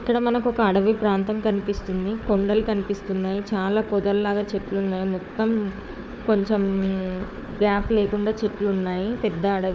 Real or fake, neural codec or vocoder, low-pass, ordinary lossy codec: fake; codec, 16 kHz, 4 kbps, FunCodec, trained on Chinese and English, 50 frames a second; none; none